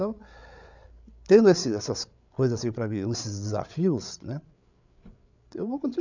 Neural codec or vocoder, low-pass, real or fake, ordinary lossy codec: codec, 16 kHz, 8 kbps, FreqCodec, larger model; 7.2 kHz; fake; none